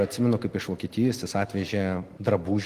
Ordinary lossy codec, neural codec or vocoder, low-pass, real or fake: Opus, 24 kbps; none; 14.4 kHz; real